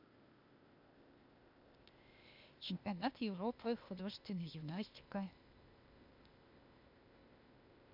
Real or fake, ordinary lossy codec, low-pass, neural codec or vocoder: fake; MP3, 48 kbps; 5.4 kHz; codec, 16 kHz, 0.8 kbps, ZipCodec